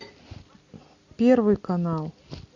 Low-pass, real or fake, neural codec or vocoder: 7.2 kHz; real; none